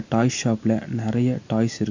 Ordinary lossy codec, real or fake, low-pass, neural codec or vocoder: AAC, 48 kbps; real; 7.2 kHz; none